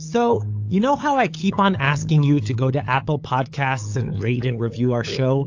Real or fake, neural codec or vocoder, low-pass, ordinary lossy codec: fake; codec, 16 kHz, 8 kbps, FunCodec, trained on LibriTTS, 25 frames a second; 7.2 kHz; AAC, 48 kbps